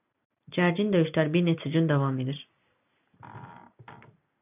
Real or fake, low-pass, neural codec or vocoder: real; 3.6 kHz; none